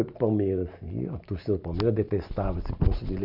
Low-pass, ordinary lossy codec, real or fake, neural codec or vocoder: 5.4 kHz; none; real; none